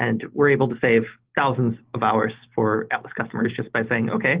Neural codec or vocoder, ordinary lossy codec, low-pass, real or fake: none; Opus, 16 kbps; 3.6 kHz; real